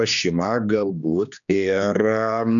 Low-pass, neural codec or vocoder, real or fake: 7.2 kHz; codec, 16 kHz, 2 kbps, X-Codec, HuBERT features, trained on general audio; fake